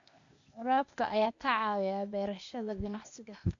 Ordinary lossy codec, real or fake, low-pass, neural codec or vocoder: AAC, 48 kbps; fake; 7.2 kHz; codec, 16 kHz, 0.8 kbps, ZipCodec